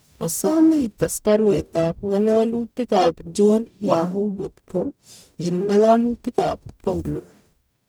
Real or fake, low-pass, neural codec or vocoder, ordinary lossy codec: fake; none; codec, 44.1 kHz, 0.9 kbps, DAC; none